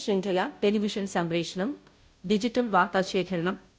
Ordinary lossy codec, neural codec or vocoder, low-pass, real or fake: none; codec, 16 kHz, 0.5 kbps, FunCodec, trained on Chinese and English, 25 frames a second; none; fake